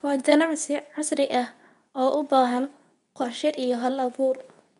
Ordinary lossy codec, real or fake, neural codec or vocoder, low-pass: none; fake; codec, 24 kHz, 0.9 kbps, WavTokenizer, medium speech release version 2; 10.8 kHz